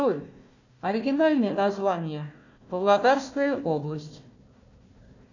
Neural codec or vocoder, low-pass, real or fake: codec, 16 kHz, 1 kbps, FunCodec, trained on Chinese and English, 50 frames a second; 7.2 kHz; fake